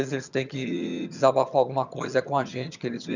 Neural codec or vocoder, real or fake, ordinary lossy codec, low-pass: vocoder, 22.05 kHz, 80 mel bands, HiFi-GAN; fake; none; 7.2 kHz